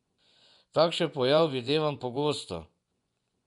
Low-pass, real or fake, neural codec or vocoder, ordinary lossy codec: 10.8 kHz; fake; vocoder, 24 kHz, 100 mel bands, Vocos; none